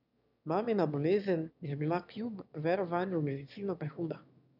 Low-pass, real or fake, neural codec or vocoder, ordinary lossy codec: 5.4 kHz; fake; autoencoder, 22.05 kHz, a latent of 192 numbers a frame, VITS, trained on one speaker; none